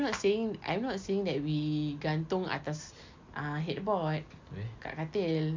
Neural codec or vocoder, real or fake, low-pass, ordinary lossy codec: none; real; 7.2 kHz; MP3, 64 kbps